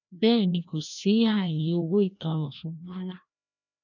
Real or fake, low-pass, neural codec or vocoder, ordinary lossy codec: fake; 7.2 kHz; codec, 16 kHz, 1 kbps, FreqCodec, larger model; none